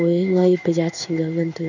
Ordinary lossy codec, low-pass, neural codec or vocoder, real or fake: none; 7.2 kHz; codec, 16 kHz in and 24 kHz out, 1 kbps, XY-Tokenizer; fake